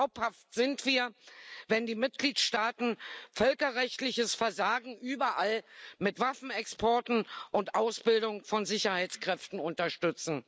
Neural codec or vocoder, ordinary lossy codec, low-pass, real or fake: none; none; none; real